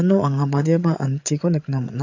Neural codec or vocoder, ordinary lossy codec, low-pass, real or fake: codec, 16 kHz, 8 kbps, FreqCodec, larger model; AAC, 48 kbps; 7.2 kHz; fake